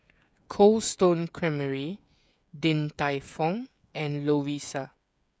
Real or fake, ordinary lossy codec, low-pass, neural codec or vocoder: fake; none; none; codec, 16 kHz, 16 kbps, FreqCodec, smaller model